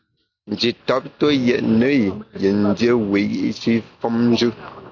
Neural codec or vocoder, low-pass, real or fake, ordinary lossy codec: none; 7.2 kHz; real; AAC, 48 kbps